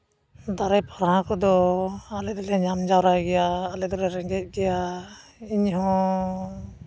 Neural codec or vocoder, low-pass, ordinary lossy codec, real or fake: none; none; none; real